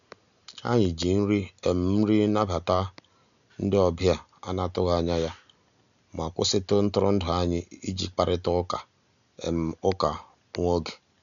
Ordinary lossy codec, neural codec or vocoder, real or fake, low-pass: none; none; real; 7.2 kHz